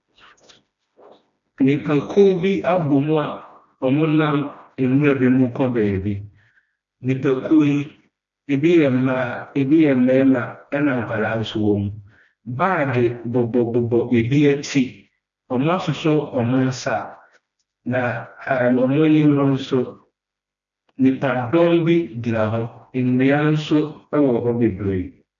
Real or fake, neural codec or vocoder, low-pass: fake; codec, 16 kHz, 1 kbps, FreqCodec, smaller model; 7.2 kHz